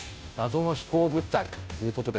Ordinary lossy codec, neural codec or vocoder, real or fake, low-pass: none; codec, 16 kHz, 0.5 kbps, FunCodec, trained on Chinese and English, 25 frames a second; fake; none